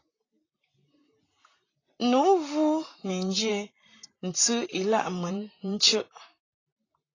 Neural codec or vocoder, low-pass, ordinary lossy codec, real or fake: vocoder, 44.1 kHz, 128 mel bands, Pupu-Vocoder; 7.2 kHz; AAC, 32 kbps; fake